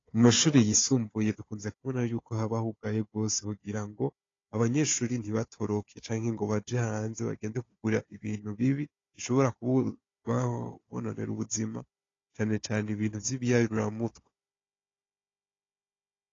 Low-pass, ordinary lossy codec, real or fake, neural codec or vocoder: 7.2 kHz; AAC, 32 kbps; fake; codec, 16 kHz, 4 kbps, FunCodec, trained on Chinese and English, 50 frames a second